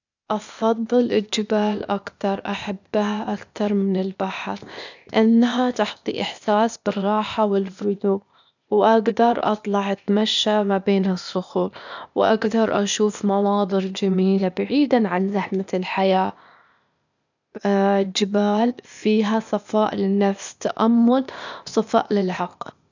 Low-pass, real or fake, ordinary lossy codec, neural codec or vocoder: 7.2 kHz; fake; none; codec, 16 kHz, 0.8 kbps, ZipCodec